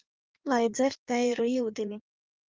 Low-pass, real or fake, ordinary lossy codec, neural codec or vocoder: 7.2 kHz; fake; Opus, 24 kbps; codec, 16 kHz in and 24 kHz out, 1.1 kbps, FireRedTTS-2 codec